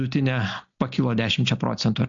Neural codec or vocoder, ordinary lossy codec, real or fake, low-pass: none; MP3, 64 kbps; real; 7.2 kHz